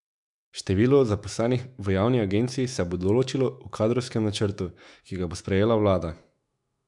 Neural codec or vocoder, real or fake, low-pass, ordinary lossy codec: autoencoder, 48 kHz, 128 numbers a frame, DAC-VAE, trained on Japanese speech; fake; 10.8 kHz; none